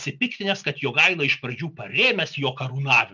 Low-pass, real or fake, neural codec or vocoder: 7.2 kHz; real; none